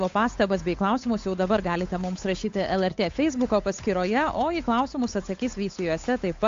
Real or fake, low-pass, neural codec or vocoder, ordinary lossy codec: fake; 7.2 kHz; codec, 16 kHz, 8 kbps, FunCodec, trained on Chinese and English, 25 frames a second; AAC, 48 kbps